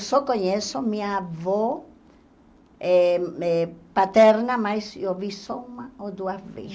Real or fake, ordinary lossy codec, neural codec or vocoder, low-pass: real; none; none; none